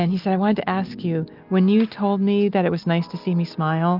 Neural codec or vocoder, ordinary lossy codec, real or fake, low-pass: none; Opus, 24 kbps; real; 5.4 kHz